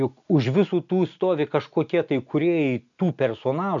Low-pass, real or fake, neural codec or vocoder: 7.2 kHz; real; none